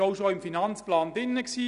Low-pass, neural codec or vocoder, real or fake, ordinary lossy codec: 10.8 kHz; none; real; none